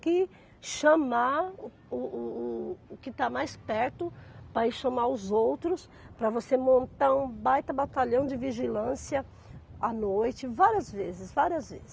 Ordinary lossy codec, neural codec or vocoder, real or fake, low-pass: none; none; real; none